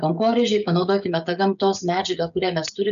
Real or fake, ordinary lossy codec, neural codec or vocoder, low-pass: fake; AAC, 96 kbps; codec, 16 kHz, 16 kbps, FreqCodec, smaller model; 7.2 kHz